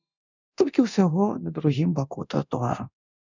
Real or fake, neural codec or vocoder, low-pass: fake; codec, 24 kHz, 0.9 kbps, DualCodec; 7.2 kHz